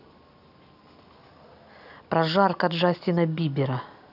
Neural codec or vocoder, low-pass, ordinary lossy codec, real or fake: none; 5.4 kHz; none; real